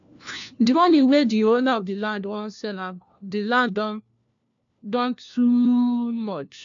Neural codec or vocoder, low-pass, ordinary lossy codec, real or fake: codec, 16 kHz, 1 kbps, FunCodec, trained on LibriTTS, 50 frames a second; 7.2 kHz; AAC, 64 kbps; fake